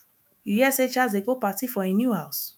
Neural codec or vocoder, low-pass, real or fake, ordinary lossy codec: autoencoder, 48 kHz, 128 numbers a frame, DAC-VAE, trained on Japanese speech; none; fake; none